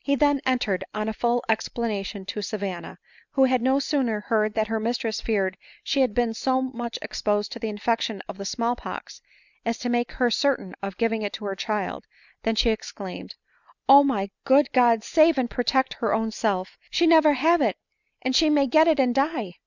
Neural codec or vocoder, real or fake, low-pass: none; real; 7.2 kHz